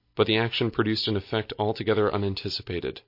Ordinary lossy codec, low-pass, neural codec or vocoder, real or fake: MP3, 32 kbps; 5.4 kHz; none; real